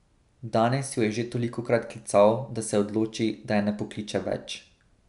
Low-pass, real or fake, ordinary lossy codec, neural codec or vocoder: 10.8 kHz; real; none; none